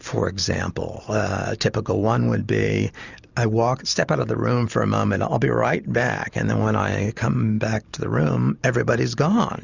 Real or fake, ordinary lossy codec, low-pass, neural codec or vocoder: real; Opus, 64 kbps; 7.2 kHz; none